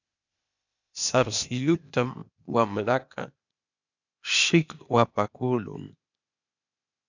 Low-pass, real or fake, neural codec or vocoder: 7.2 kHz; fake; codec, 16 kHz, 0.8 kbps, ZipCodec